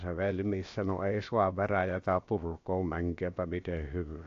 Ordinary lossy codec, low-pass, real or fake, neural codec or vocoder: AAC, 48 kbps; 7.2 kHz; fake; codec, 16 kHz, about 1 kbps, DyCAST, with the encoder's durations